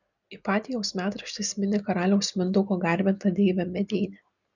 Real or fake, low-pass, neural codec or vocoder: real; 7.2 kHz; none